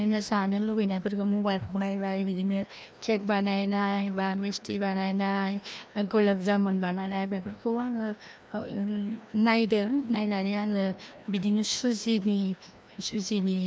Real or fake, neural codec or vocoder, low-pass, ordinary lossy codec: fake; codec, 16 kHz, 1 kbps, FreqCodec, larger model; none; none